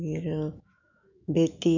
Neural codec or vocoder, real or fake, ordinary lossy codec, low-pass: autoencoder, 48 kHz, 128 numbers a frame, DAC-VAE, trained on Japanese speech; fake; none; 7.2 kHz